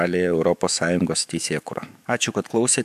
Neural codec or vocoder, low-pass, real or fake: autoencoder, 48 kHz, 128 numbers a frame, DAC-VAE, trained on Japanese speech; 14.4 kHz; fake